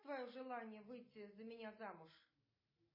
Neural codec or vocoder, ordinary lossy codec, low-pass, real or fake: none; MP3, 24 kbps; 5.4 kHz; real